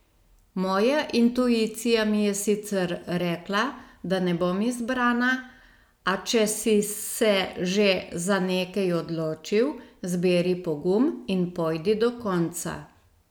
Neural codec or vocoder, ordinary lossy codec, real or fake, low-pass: none; none; real; none